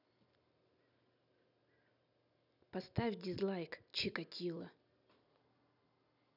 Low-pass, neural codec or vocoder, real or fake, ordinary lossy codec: 5.4 kHz; none; real; none